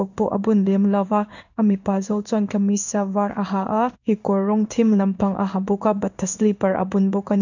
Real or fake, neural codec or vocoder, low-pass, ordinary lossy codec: fake; codec, 16 kHz in and 24 kHz out, 1 kbps, XY-Tokenizer; 7.2 kHz; none